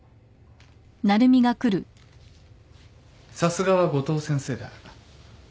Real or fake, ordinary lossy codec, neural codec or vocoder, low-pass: real; none; none; none